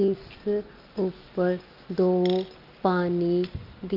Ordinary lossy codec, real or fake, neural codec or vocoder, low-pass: Opus, 24 kbps; real; none; 5.4 kHz